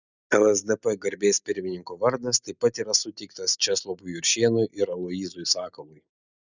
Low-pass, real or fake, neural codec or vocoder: 7.2 kHz; real; none